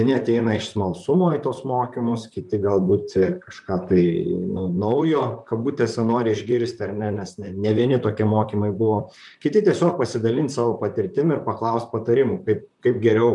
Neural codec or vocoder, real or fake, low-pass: vocoder, 44.1 kHz, 128 mel bands, Pupu-Vocoder; fake; 10.8 kHz